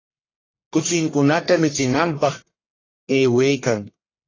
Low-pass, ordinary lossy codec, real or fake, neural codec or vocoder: 7.2 kHz; AAC, 32 kbps; fake; codec, 44.1 kHz, 1.7 kbps, Pupu-Codec